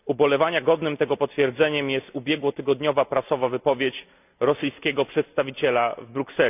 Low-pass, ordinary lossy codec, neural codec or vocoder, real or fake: 3.6 kHz; none; none; real